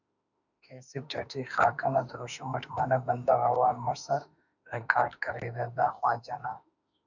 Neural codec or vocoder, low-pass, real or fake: autoencoder, 48 kHz, 32 numbers a frame, DAC-VAE, trained on Japanese speech; 7.2 kHz; fake